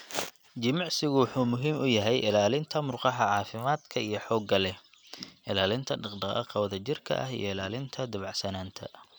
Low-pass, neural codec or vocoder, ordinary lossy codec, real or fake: none; none; none; real